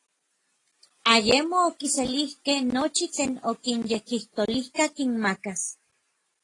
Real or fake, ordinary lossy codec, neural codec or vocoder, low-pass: real; AAC, 32 kbps; none; 10.8 kHz